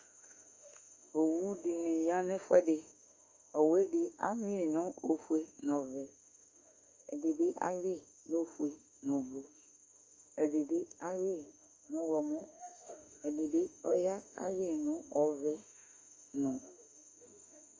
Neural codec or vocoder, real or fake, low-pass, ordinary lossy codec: autoencoder, 48 kHz, 32 numbers a frame, DAC-VAE, trained on Japanese speech; fake; 7.2 kHz; Opus, 32 kbps